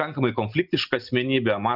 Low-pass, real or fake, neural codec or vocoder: 5.4 kHz; real; none